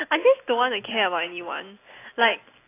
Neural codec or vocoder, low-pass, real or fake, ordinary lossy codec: none; 3.6 kHz; real; AAC, 24 kbps